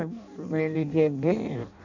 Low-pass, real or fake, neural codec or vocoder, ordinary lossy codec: 7.2 kHz; fake; codec, 16 kHz in and 24 kHz out, 0.6 kbps, FireRedTTS-2 codec; none